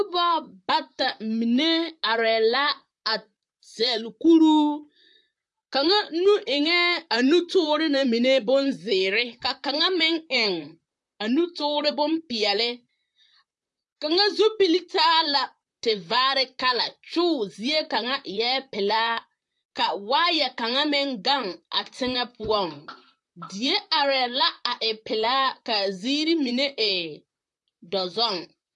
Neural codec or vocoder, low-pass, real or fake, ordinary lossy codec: vocoder, 44.1 kHz, 128 mel bands, Pupu-Vocoder; 10.8 kHz; fake; AAC, 64 kbps